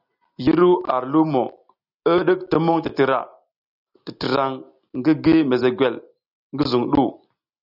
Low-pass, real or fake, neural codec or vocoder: 5.4 kHz; real; none